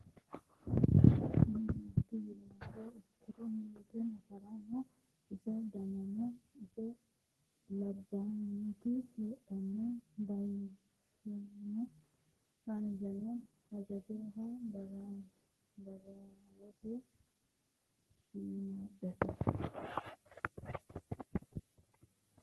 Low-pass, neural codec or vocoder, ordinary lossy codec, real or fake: 14.4 kHz; codec, 44.1 kHz, 3.4 kbps, Pupu-Codec; Opus, 16 kbps; fake